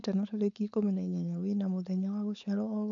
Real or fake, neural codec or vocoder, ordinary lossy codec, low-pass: fake; codec, 16 kHz, 4.8 kbps, FACodec; none; 7.2 kHz